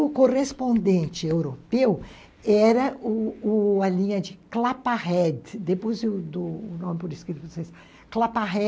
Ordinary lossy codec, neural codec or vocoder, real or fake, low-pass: none; none; real; none